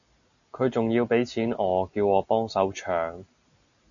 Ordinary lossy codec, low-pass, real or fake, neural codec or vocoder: MP3, 96 kbps; 7.2 kHz; real; none